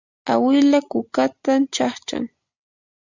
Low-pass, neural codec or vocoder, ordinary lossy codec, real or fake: 7.2 kHz; none; Opus, 64 kbps; real